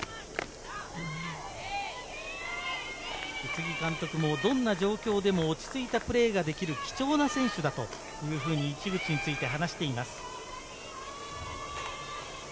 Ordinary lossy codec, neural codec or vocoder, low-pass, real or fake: none; none; none; real